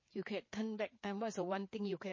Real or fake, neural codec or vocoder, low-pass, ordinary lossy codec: fake; codec, 16 kHz, 4 kbps, FreqCodec, larger model; 7.2 kHz; MP3, 32 kbps